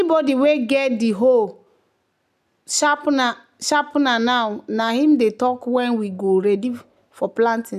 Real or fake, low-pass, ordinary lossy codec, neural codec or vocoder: real; 14.4 kHz; none; none